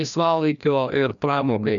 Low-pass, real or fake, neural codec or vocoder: 7.2 kHz; fake; codec, 16 kHz, 1 kbps, FreqCodec, larger model